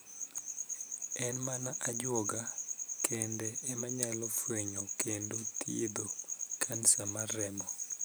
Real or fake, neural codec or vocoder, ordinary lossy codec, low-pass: fake; vocoder, 44.1 kHz, 128 mel bands every 512 samples, BigVGAN v2; none; none